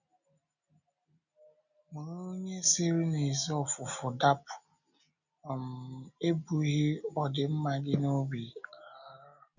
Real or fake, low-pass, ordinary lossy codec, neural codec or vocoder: real; 7.2 kHz; none; none